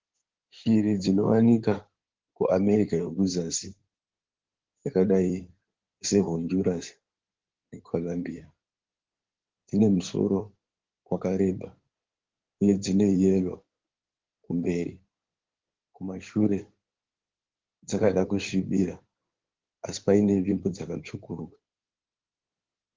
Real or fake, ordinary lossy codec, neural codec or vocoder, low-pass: fake; Opus, 16 kbps; codec, 16 kHz in and 24 kHz out, 2.2 kbps, FireRedTTS-2 codec; 7.2 kHz